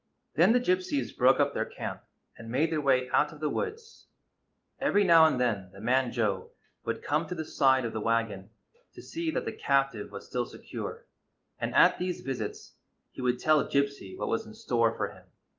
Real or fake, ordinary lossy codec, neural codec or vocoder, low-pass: real; Opus, 32 kbps; none; 7.2 kHz